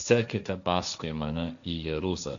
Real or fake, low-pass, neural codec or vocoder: fake; 7.2 kHz; codec, 16 kHz, 1.1 kbps, Voila-Tokenizer